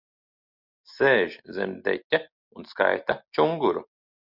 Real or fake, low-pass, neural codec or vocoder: real; 5.4 kHz; none